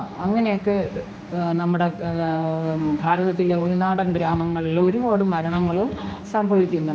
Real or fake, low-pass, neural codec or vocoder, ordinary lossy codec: fake; none; codec, 16 kHz, 2 kbps, X-Codec, HuBERT features, trained on general audio; none